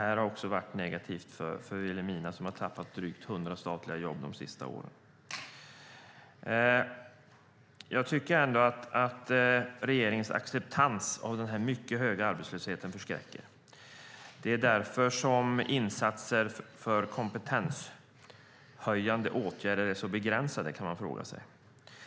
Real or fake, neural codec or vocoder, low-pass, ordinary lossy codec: real; none; none; none